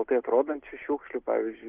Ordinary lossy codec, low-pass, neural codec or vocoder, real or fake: Opus, 32 kbps; 3.6 kHz; none; real